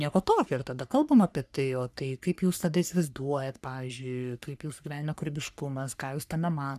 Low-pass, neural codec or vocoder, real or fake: 14.4 kHz; codec, 44.1 kHz, 3.4 kbps, Pupu-Codec; fake